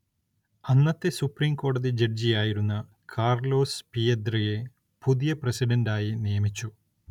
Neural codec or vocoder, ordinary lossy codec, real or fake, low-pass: none; none; real; 19.8 kHz